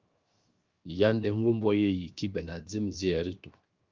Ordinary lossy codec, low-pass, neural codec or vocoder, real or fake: Opus, 24 kbps; 7.2 kHz; codec, 16 kHz, 0.7 kbps, FocalCodec; fake